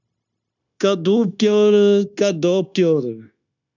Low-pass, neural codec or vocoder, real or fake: 7.2 kHz; codec, 16 kHz, 0.9 kbps, LongCat-Audio-Codec; fake